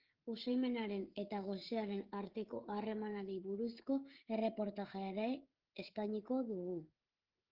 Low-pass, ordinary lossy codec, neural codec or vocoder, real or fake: 5.4 kHz; Opus, 16 kbps; codec, 16 kHz, 16 kbps, FreqCodec, smaller model; fake